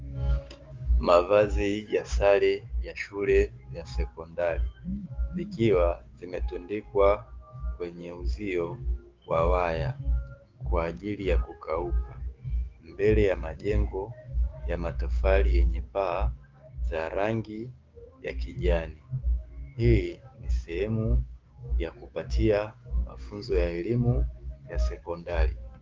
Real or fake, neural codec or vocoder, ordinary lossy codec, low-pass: fake; codec, 16 kHz, 6 kbps, DAC; Opus, 32 kbps; 7.2 kHz